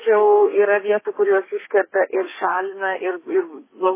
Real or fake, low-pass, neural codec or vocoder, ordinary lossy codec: fake; 3.6 kHz; codec, 32 kHz, 1.9 kbps, SNAC; MP3, 16 kbps